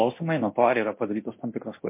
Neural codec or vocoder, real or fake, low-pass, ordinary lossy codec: codec, 24 kHz, 0.9 kbps, DualCodec; fake; 3.6 kHz; MP3, 24 kbps